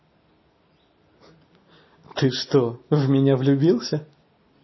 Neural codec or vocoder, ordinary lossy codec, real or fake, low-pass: none; MP3, 24 kbps; real; 7.2 kHz